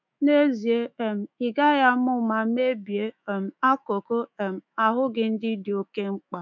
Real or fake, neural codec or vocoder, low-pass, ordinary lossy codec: fake; autoencoder, 48 kHz, 128 numbers a frame, DAC-VAE, trained on Japanese speech; 7.2 kHz; none